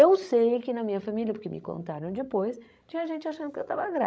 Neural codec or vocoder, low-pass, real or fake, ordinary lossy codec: codec, 16 kHz, 16 kbps, FreqCodec, larger model; none; fake; none